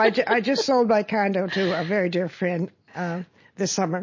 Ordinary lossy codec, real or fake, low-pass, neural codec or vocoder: MP3, 32 kbps; real; 7.2 kHz; none